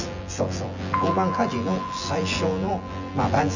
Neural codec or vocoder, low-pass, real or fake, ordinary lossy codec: vocoder, 24 kHz, 100 mel bands, Vocos; 7.2 kHz; fake; none